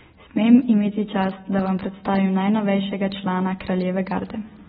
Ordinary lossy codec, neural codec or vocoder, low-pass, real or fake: AAC, 16 kbps; none; 19.8 kHz; real